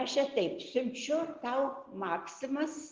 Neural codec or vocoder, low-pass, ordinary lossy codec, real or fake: none; 7.2 kHz; Opus, 16 kbps; real